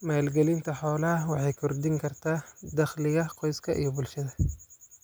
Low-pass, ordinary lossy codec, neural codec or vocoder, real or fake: none; none; none; real